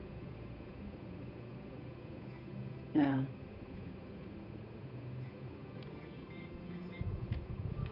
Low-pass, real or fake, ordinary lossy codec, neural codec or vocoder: 5.4 kHz; fake; none; codec, 16 kHz, 8 kbps, FunCodec, trained on Chinese and English, 25 frames a second